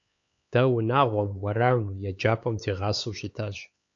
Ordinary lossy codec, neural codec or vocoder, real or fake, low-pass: AAC, 48 kbps; codec, 16 kHz, 4 kbps, X-Codec, HuBERT features, trained on LibriSpeech; fake; 7.2 kHz